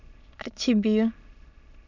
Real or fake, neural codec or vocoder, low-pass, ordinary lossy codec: fake; autoencoder, 22.05 kHz, a latent of 192 numbers a frame, VITS, trained on many speakers; 7.2 kHz; Opus, 64 kbps